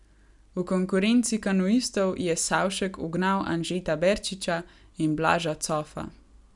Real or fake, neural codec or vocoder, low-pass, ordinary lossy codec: real; none; 10.8 kHz; none